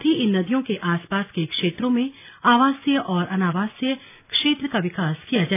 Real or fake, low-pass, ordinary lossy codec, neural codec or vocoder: real; 3.6 kHz; none; none